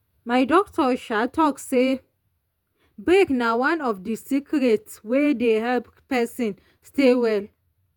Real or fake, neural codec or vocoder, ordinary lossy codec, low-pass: fake; vocoder, 48 kHz, 128 mel bands, Vocos; none; 19.8 kHz